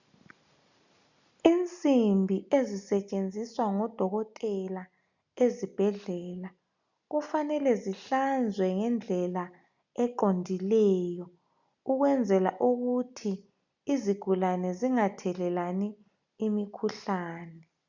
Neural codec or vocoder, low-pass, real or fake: none; 7.2 kHz; real